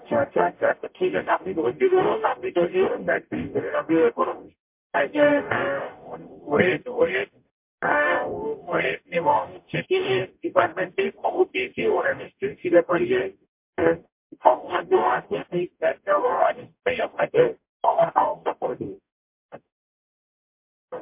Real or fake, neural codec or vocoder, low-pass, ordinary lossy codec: fake; codec, 44.1 kHz, 0.9 kbps, DAC; 3.6 kHz; MP3, 32 kbps